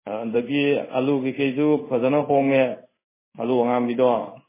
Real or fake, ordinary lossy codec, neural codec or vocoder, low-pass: fake; MP3, 16 kbps; codec, 16 kHz in and 24 kHz out, 1 kbps, XY-Tokenizer; 3.6 kHz